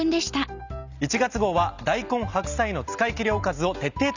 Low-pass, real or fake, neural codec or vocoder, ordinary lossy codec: 7.2 kHz; real; none; none